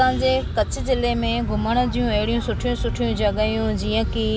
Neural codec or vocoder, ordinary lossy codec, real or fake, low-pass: none; none; real; none